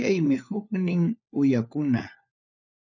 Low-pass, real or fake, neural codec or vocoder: 7.2 kHz; fake; codec, 16 kHz, 4 kbps, FunCodec, trained on LibriTTS, 50 frames a second